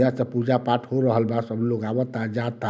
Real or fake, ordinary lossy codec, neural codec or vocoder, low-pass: real; none; none; none